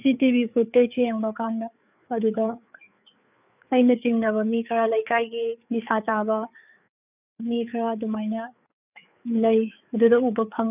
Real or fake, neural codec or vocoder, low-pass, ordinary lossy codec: fake; codec, 16 kHz, 4 kbps, FreqCodec, larger model; 3.6 kHz; none